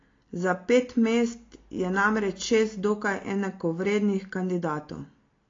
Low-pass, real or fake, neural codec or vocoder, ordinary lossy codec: 7.2 kHz; real; none; AAC, 32 kbps